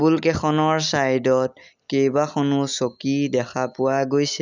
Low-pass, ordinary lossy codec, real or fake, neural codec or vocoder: 7.2 kHz; none; real; none